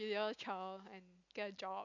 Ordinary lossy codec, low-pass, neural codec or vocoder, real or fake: none; 7.2 kHz; none; real